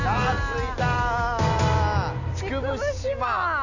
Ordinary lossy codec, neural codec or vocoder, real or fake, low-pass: none; none; real; 7.2 kHz